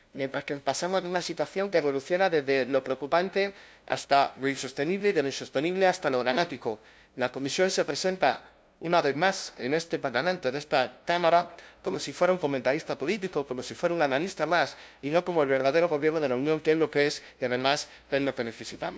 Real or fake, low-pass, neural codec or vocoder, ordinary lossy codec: fake; none; codec, 16 kHz, 0.5 kbps, FunCodec, trained on LibriTTS, 25 frames a second; none